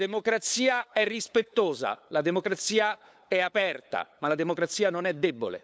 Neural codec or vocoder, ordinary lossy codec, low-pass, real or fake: codec, 16 kHz, 8 kbps, FunCodec, trained on LibriTTS, 25 frames a second; none; none; fake